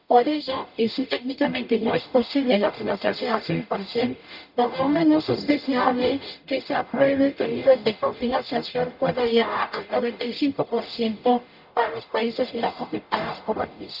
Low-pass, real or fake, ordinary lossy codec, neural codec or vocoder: 5.4 kHz; fake; none; codec, 44.1 kHz, 0.9 kbps, DAC